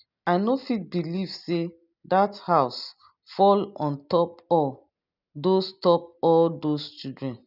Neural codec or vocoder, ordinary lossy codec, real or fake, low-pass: none; none; real; 5.4 kHz